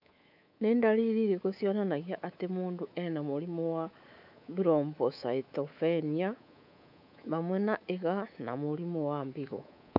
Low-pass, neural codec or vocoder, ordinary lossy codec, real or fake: 5.4 kHz; codec, 24 kHz, 3.1 kbps, DualCodec; none; fake